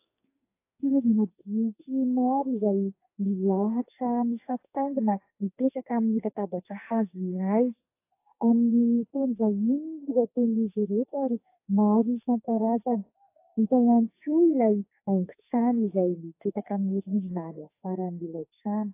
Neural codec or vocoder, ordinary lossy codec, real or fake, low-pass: codec, 44.1 kHz, 2.6 kbps, SNAC; AAC, 24 kbps; fake; 3.6 kHz